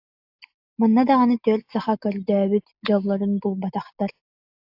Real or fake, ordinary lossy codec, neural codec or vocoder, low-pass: real; AAC, 48 kbps; none; 5.4 kHz